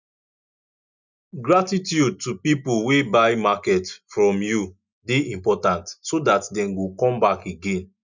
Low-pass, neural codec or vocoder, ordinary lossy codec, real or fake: 7.2 kHz; none; none; real